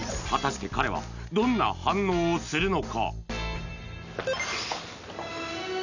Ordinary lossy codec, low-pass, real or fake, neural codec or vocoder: none; 7.2 kHz; real; none